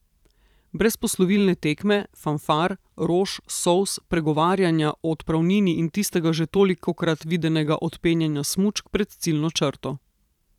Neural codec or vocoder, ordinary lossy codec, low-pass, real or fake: vocoder, 44.1 kHz, 128 mel bands every 512 samples, BigVGAN v2; none; 19.8 kHz; fake